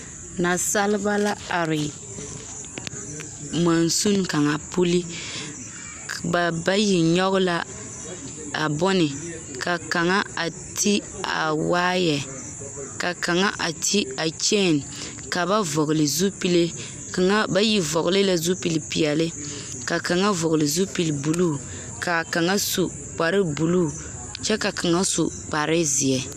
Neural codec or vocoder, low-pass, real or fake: none; 14.4 kHz; real